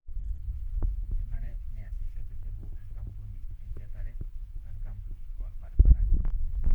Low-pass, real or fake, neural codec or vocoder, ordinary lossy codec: 19.8 kHz; fake; codec, 44.1 kHz, 7.8 kbps, Pupu-Codec; none